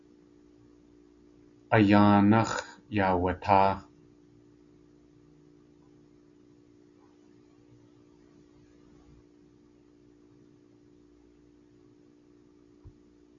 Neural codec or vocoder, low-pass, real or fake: none; 7.2 kHz; real